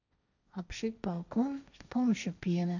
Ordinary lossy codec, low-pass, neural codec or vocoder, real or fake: none; 7.2 kHz; codec, 16 kHz, 1.1 kbps, Voila-Tokenizer; fake